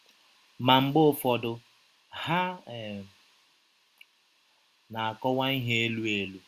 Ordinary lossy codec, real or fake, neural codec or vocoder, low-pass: Opus, 64 kbps; real; none; 14.4 kHz